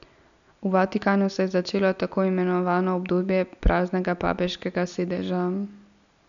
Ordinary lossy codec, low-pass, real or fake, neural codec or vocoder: none; 7.2 kHz; real; none